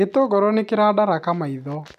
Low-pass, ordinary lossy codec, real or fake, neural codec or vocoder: 14.4 kHz; none; real; none